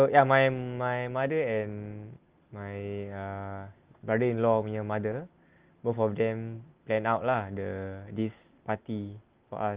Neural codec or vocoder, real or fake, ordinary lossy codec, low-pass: none; real; Opus, 32 kbps; 3.6 kHz